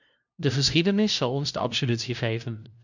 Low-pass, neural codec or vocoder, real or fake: 7.2 kHz; codec, 16 kHz, 0.5 kbps, FunCodec, trained on LibriTTS, 25 frames a second; fake